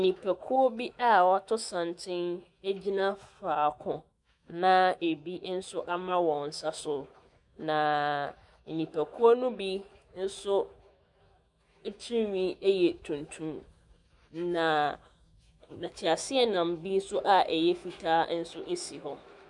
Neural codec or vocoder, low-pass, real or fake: autoencoder, 48 kHz, 128 numbers a frame, DAC-VAE, trained on Japanese speech; 10.8 kHz; fake